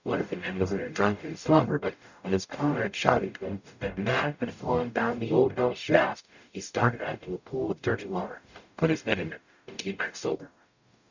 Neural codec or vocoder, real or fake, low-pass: codec, 44.1 kHz, 0.9 kbps, DAC; fake; 7.2 kHz